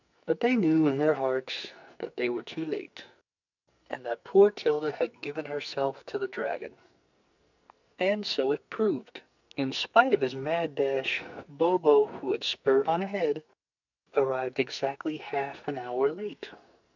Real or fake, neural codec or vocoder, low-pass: fake; codec, 44.1 kHz, 2.6 kbps, SNAC; 7.2 kHz